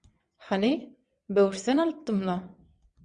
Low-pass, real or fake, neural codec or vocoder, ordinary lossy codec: 9.9 kHz; fake; vocoder, 22.05 kHz, 80 mel bands, WaveNeXt; Opus, 64 kbps